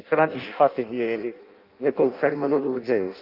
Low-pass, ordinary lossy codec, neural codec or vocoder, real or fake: 5.4 kHz; Opus, 24 kbps; codec, 16 kHz in and 24 kHz out, 0.6 kbps, FireRedTTS-2 codec; fake